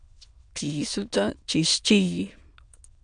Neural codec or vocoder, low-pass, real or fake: autoencoder, 22.05 kHz, a latent of 192 numbers a frame, VITS, trained on many speakers; 9.9 kHz; fake